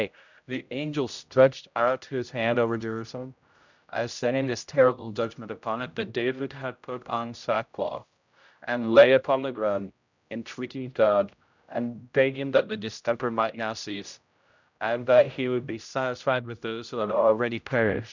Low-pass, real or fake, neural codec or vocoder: 7.2 kHz; fake; codec, 16 kHz, 0.5 kbps, X-Codec, HuBERT features, trained on general audio